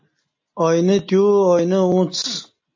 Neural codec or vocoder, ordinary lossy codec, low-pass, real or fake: none; MP3, 32 kbps; 7.2 kHz; real